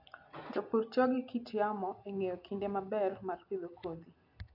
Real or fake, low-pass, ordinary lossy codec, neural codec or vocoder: real; 5.4 kHz; AAC, 48 kbps; none